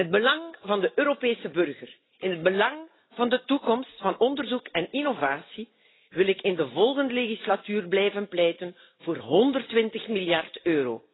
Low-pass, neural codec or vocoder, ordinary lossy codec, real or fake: 7.2 kHz; none; AAC, 16 kbps; real